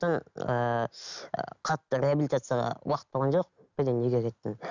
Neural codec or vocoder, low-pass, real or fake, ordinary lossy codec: none; 7.2 kHz; real; none